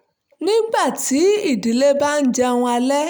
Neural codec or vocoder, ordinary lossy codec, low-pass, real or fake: none; none; none; real